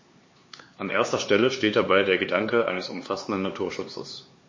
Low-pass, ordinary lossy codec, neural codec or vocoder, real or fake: 7.2 kHz; MP3, 32 kbps; codec, 16 kHz, 4 kbps, X-Codec, HuBERT features, trained on LibriSpeech; fake